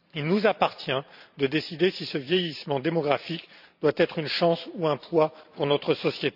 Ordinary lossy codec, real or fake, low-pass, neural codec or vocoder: none; fake; 5.4 kHz; vocoder, 44.1 kHz, 128 mel bands every 512 samples, BigVGAN v2